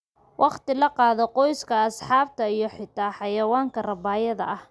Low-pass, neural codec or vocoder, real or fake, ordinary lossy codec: none; none; real; none